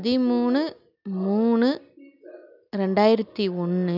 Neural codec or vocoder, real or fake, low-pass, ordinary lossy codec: none; real; 5.4 kHz; none